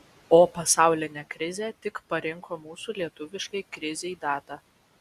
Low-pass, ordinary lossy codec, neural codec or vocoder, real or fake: 14.4 kHz; Opus, 64 kbps; none; real